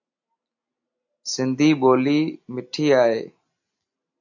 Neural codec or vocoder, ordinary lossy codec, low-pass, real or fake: none; AAC, 48 kbps; 7.2 kHz; real